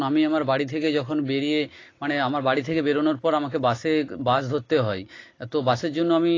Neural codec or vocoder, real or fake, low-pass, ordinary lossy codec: none; real; 7.2 kHz; AAC, 32 kbps